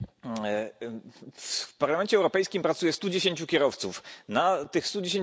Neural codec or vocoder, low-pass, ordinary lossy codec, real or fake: none; none; none; real